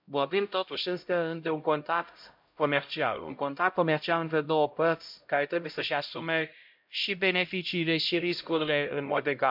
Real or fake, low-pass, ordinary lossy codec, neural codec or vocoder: fake; 5.4 kHz; MP3, 48 kbps; codec, 16 kHz, 0.5 kbps, X-Codec, HuBERT features, trained on LibriSpeech